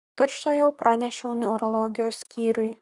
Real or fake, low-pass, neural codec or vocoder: fake; 10.8 kHz; codec, 24 kHz, 1 kbps, SNAC